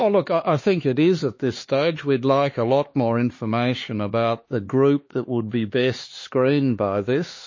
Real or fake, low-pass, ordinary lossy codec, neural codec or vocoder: fake; 7.2 kHz; MP3, 32 kbps; codec, 16 kHz, 4 kbps, X-Codec, HuBERT features, trained on LibriSpeech